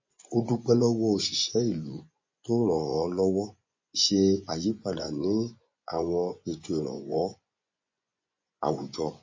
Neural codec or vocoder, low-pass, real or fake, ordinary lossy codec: vocoder, 24 kHz, 100 mel bands, Vocos; 7.2 kHz; fake; MP3, 32 kbps